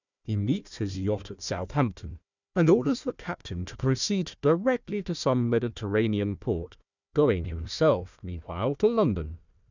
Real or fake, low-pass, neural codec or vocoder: fake; 7.2 kHz; codec, 16 kHz, 1 kbps, FunCodec, trained on Chinese and English, 50 frames a second